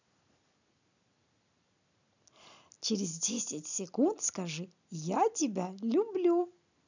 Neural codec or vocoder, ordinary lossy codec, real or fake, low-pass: none; none; real; 7.2 kHz